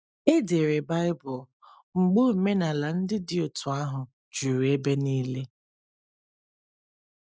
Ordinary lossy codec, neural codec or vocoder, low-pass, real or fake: none; none; none; real